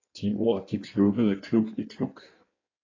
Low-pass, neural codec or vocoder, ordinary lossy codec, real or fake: 7.2 kHz; codec, 16 kHz in and 24 kHz out, 1.1 kbps, FireRedTTS-2 codec; AAC, 32 kbps; fake